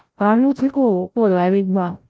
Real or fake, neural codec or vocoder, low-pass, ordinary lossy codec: fake; codec, 16 kHz, 0.5 kbps, FreqCodec, larger model; none; none